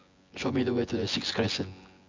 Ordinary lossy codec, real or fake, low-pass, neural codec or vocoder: MP3, 64 kbps; fake; 7.2 kHz; vocoder, 24 kHz, 100 mel bands, Vocos